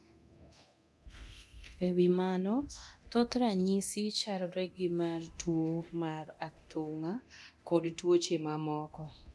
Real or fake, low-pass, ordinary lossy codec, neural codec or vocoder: fake; none; none; codec, 24 kHz, 0.9 kbps, DualCodec